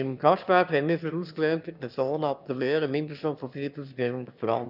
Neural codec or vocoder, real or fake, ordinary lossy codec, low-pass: autoencoder, 22.05 kHz, a latent of 192 numbers a frame, VITS, trained on one speaker; fake; none; 5.4 kHz